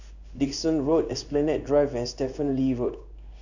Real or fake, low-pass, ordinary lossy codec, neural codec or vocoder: fake; 7.2 kHz; none; codec, 16 kHz in and 24 kHz out, 1 kbps, XY-Tokenizer